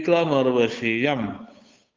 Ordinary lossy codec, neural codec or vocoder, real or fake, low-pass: Opus, 24 kbps; codec, 16 kHz, 8 kbps, FunCodec, trained on Chinese and English, 25 frames a second; fake; 7.2 kHz